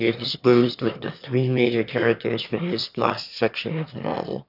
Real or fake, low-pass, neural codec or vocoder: fake; 5.4 kHz; autoencoder, 22.05 kHz, a latent of 192 numbers a frame, VITS, trained on one speaker